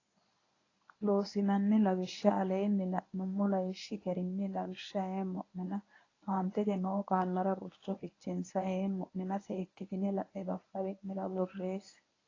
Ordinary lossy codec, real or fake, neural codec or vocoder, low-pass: AAC, 32 kbps; fake; codec, 24 kHz, 0.9 kbps, WavTokenizer, medium speech release version 1; 7.2 kHz